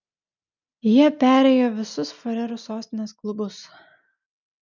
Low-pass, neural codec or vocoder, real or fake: 7.2 kHz; none; real